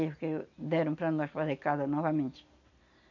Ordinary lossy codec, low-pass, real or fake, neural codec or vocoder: none; 7.2 kHz; real; none